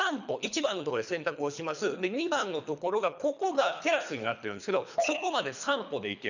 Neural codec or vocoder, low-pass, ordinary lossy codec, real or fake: codec, 24 kHz, 3 kbps, HILCodec; 7.2 kHz; none; fake